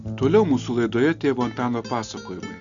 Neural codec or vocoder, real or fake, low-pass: none; real; 7.2 kHz